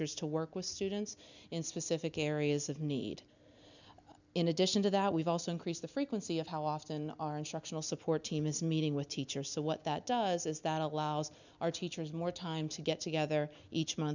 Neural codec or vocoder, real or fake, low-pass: none; real; 7.2 kHz